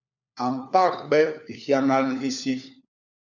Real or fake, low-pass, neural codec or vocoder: fake; 7.2 kHz; codec, 16 kHz, 4 kbps, FunCodec, trained on LibriTTS, 50 frames a second